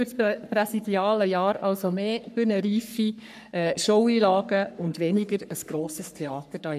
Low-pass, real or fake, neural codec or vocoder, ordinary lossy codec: 14.4 kHz; fake; codec, 44.1 kHz, 3.4 kbps, Pupu-Codec; none